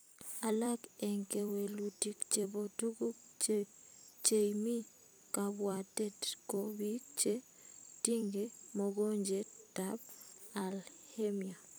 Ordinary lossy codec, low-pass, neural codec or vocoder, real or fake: none; none; vocoder, 44.1 kHz, 128 mel bands every 256 samples, BigVGAN v2; fake